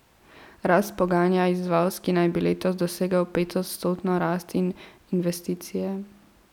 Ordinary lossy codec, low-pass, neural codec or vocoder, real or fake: none; 19.8 kHz; none; real